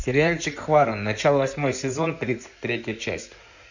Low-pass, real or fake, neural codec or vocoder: 7.2 kHz; fake; codec, 16 kHz in and 24 kHz out, 2.2 kbps, FireRedTTS-2 codec